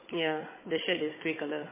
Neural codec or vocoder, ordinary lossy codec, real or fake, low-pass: codec, 16 kHz, 16 kbps, FunCodec, trained on Chinese and English, 50 frames a second; MP3, 16 kbps; fake; 3.6 kHz